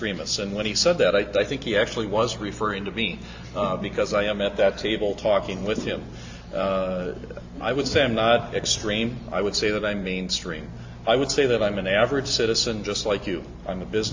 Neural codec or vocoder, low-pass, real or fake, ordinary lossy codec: none; 7.2 kHz; real; AAC, 48 kbps